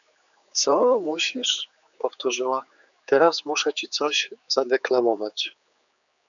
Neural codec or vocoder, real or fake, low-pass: codec, 16 kHz, 4 kbps, X-Codec, HuBERT features, trained on general audio; fake; 7.2 kHz